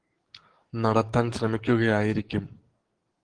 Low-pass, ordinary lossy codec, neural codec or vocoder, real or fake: 9.9 kHz; Opus, 16 kbps; codec, 44.1 kHz, 7.8 kbps, DAC; fake